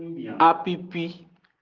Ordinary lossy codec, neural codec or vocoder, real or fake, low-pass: Opus, 24 kbps; none; real; 7.2 kHz